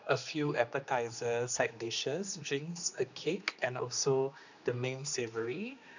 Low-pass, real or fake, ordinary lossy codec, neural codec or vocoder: 7.2 kHz; fake; none; codec, 16 kHz, 2 kbps, X-Codec, HuBERT features, trained on general audio